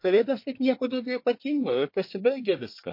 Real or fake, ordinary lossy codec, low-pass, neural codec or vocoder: fake; MP3, 32 kbps; 5.4 kHz; codec, 24 kHz, 1 kbps, SNAC